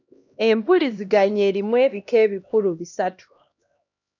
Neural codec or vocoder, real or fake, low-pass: codec, 16 kHz, 1 kbps, X-Codec, HuBERT features, trained on LibriSpeech; fake; 7.2 kHz